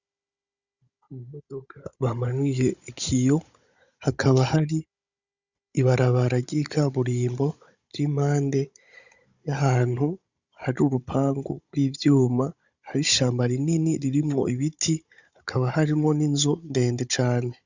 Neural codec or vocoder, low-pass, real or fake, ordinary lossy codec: codec, 16 kHz, 16 kbps, FunCodec, trained on Chinese and English, 50 frames a second; 7.2 kHz; fake; Opus, 64 kbps